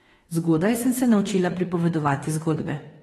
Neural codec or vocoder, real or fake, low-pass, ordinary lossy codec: autoencoder, 48 kHz, 32 numbers a frame, DAC-VAE, trained on Japanese speech; fake; 19.8 kHz; AAC, 32 kbps